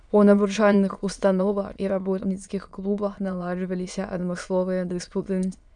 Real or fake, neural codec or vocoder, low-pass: fake; autoencoder, 22.05 kHz, a latent of 192 numbers a frame, VITS, trained on many speakers; 9.9 kHz